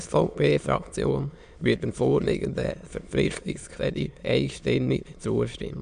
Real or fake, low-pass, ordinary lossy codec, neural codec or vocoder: fake; 9.9 kHz; none; autoencoder, 22.05 kHz, a latent of 192 numbers a frame, VITS, trained on many speakers